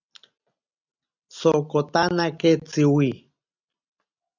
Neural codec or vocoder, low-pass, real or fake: none; 7.2 kHz; real